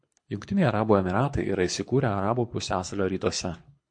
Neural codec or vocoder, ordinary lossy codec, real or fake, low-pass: codec, 24 kHz, 6 kbps, HILCodec; MP3, 48 kbps; fake; 9.9 kHz